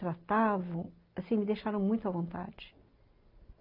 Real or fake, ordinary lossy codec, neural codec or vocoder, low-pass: real; Opus, 32 kbps; none; 5.4 kHz